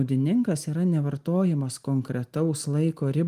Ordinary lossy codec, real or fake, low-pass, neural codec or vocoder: Opus, 24 kbps; real; 14.4 kHz; none